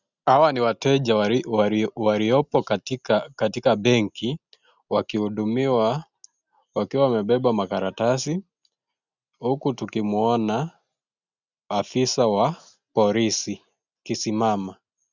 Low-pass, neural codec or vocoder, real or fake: 7.2 kHz; none; real